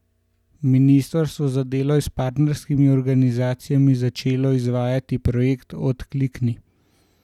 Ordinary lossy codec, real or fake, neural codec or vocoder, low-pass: none; real; none; 19.8 kHz